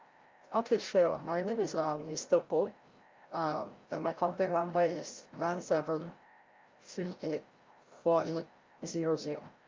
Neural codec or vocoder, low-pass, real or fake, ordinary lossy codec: codec, 16 kHz, 0.5 kbps, FreqCodec, larger model; 7.2 kHz; fake; Opus, 24 kbps